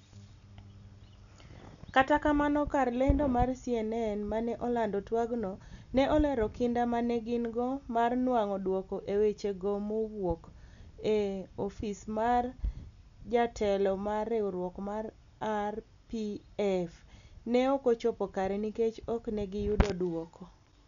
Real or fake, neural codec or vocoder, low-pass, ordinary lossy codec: real; none; 7.2 kHz; none